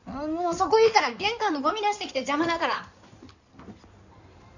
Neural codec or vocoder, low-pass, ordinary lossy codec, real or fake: codec, 16 kHz in and 24 kHz out, 2.2 kbps, FireRedTTS-2 codec; 7.2 kHz; none; fake